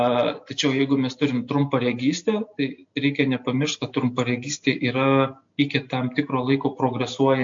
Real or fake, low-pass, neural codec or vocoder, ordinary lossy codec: real; 7.2 kHz; none; MP3, 48 kbps